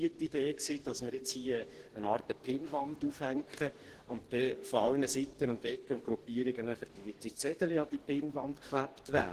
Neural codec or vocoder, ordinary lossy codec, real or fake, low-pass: codec, 44.1 kHz, 2.6 kbps, DAC; Opus, 16 kbps; fake; 14.4 kHz